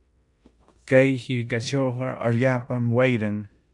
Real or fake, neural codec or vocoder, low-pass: fake; codec, 16 kHz in and 24 kHz out, 0.9 kbps, LongCat-Audio-Codec, four codebook decoder; 10.8 kHz